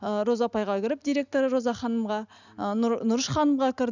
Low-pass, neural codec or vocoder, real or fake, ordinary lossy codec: 7.2 kHz; none; real; none